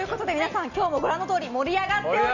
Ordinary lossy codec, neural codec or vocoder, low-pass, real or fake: Opus, 64 kbps; none; 7.2 kHz; real